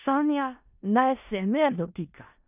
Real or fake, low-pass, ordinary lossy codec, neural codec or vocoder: fake; 3.6 kHz; none; codec, 16 kHz in and 24 kHz out, 0.4 kbps, LongCat-Audio-Codec, four codebook decoder